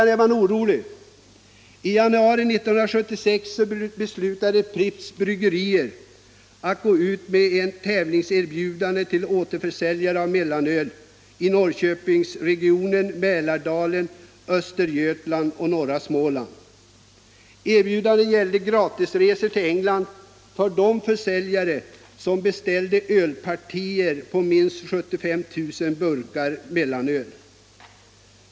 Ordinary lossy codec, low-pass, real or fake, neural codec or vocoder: none; none; real; none